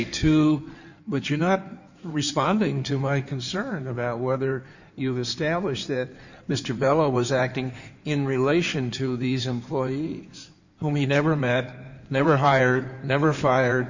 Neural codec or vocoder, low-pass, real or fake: codec, 16 kHz in and 24 kHz out, 2.2 kbps, FireRedTTS-2 codec; 7.2 kHz; fake